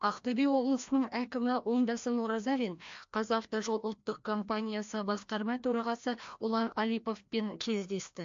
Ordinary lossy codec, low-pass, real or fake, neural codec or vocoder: MP3, 48 kbps; 7.2 kHz; fake; codec, 16 kHz, 1 kbps, FreqCodec, larger model